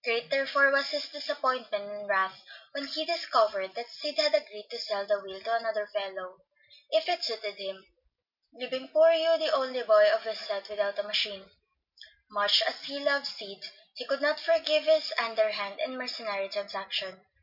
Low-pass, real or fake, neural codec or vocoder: 5.4 kHz; real; none